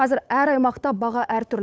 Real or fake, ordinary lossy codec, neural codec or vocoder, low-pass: fake; none; codec, 16 kHz, 8 kbps, FunCodec, trained on Chinese and English, 25 frames a second; none